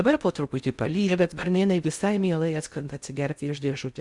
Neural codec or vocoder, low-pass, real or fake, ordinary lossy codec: codec, 16 kHz in and 24 kHz out, 0.6 kbps, FocalCodec, streaming, 2048 codes; 10.8 kHz; fake; Opus, 64 kbps